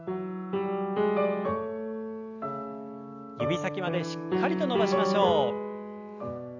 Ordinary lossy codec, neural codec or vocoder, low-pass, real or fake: none; none; 7.2 kHz; real